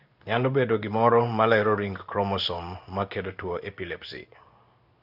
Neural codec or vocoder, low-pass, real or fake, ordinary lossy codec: codec, 16 kHz in and 24 kHz out, 1 kbps, XY-Tokenizer; 5.4 kHz; fake; none